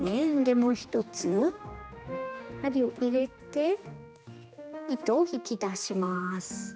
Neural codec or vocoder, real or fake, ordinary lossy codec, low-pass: codec, 16 kHz, 2 kbps, X-Codec, HuBERT features, trained on general audio; fake; none; none